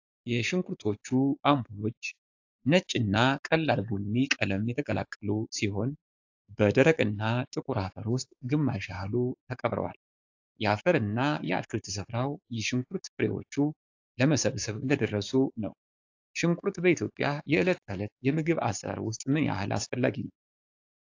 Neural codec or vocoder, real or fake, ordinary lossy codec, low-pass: codec, 16 kHz, 6 kbps, DAC; fake; AAC, 48 kbps; 7.2 kHz